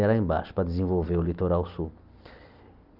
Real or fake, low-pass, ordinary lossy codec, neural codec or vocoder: real; 5.4 kHz; Opus, 32 kbps; none